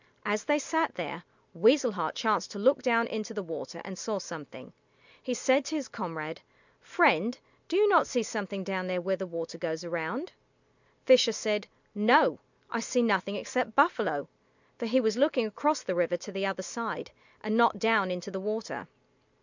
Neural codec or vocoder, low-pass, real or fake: none; 7.2 kHz; real